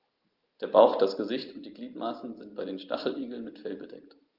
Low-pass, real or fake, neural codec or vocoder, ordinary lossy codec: 5.4 kHz; real; none; Opus, 24 kbps